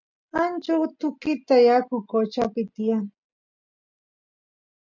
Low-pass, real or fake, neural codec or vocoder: 7.2 kHz; real; none